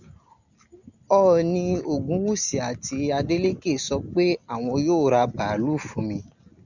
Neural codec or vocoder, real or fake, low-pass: none; real; 7.2 kHz